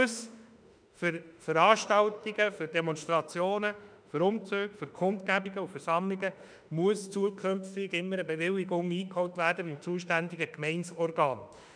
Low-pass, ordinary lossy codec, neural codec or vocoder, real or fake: 9.9 kHz; none; autoencoder, 48 kHz, 32 numbers a frame, DAC-VAE, trained on Japanese speech; fake